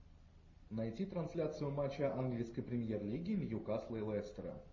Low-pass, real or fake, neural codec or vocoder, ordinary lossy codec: 7.2 kHz; real; none; MP3, 32 kbps